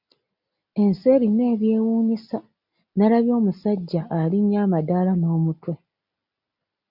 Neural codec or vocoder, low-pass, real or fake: none; 5.4 kHz; real